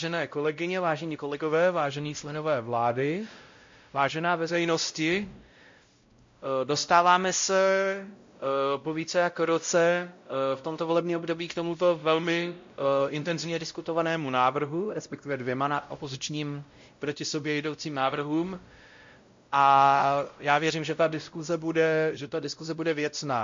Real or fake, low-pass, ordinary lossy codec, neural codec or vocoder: fake; 7.2 kHz; MP3, 48 kbps; codec, 16 kHz, 0.5 kbps, X-Codec, WavLM features, trained on Multilingual LibriSpeech